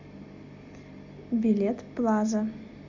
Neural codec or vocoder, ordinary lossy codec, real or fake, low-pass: none; AAC, 48 kbps; real; 7.2 kHz